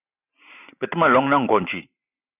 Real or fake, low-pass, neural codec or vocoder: real; 3.6 kHz; none